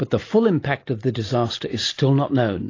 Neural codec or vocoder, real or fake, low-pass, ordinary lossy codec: none; real; 7.2 kHz; AAC, 32 kbps